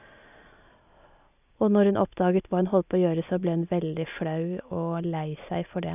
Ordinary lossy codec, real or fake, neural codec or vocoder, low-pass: none; real; none; 3.6 kHz